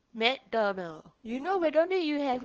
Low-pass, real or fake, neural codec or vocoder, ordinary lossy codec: 7.2 kHz; fake; codec, 24 kHz, 0.9 kbps, WavTokenizer, small release; Opus, 16 kbps